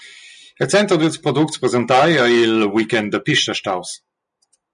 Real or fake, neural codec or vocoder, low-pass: real; none; 10.8 kHz